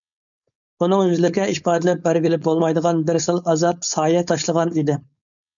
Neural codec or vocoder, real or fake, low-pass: codec, 16 kHz, 4.8 kbps, FACodec; fake; 7.2 kHz